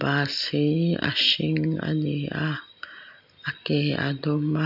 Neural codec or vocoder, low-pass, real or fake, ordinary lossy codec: none; 5.4 kHz; real; none